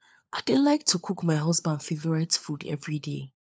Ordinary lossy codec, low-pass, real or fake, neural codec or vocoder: none; none; fake; codec, 16 kHz, 4 kbps, FunCodec, trained on LibriTTS, 50 frames a second